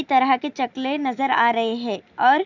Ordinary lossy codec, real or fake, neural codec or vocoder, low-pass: none; real; none; 7.2 kHz